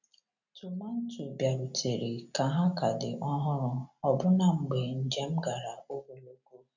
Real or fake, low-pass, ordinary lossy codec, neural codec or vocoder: real; 7.2 kHz; none; none